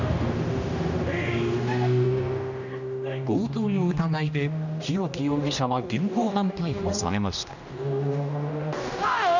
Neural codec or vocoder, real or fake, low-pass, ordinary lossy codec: codec, 16 kHz, 1 kbps, X-Codec, HuBERT features, trained on general audio; fake; 7.2 kHz; none